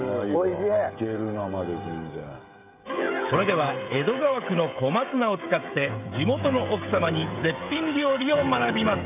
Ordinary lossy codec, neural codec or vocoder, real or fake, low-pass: none; codec, 16 kHz, 16 kbps, FreqCodec, smaller model; fake; 3.6 kHz